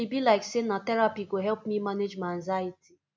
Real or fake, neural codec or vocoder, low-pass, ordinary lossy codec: real; none; none; none